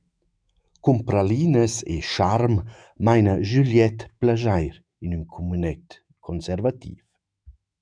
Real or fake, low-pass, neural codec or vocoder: fake; 9.9 kHz; autoencoder, 48 kHz, 128 numbers a frame, DAC-VAE, trained on Japanese speech